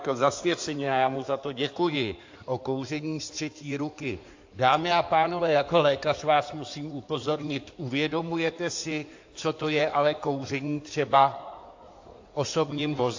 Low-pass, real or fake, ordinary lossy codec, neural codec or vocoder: 7.2 kHz; fake; AAC, 48 kbps; codec, 16 kHz in and 24 kHz out, 2.2 kbps, FireRedTTS-2 codec